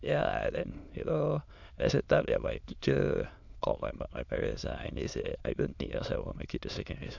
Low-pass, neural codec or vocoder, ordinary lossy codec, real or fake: 7.2 kHz; autoencoder, 22.05 kHz, a latent of 192 numbers a frame, VITS, trained on many speakers; none; fake